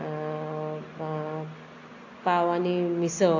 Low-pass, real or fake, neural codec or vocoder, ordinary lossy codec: 7.2 kHz; real; none; AAC, 48 kbps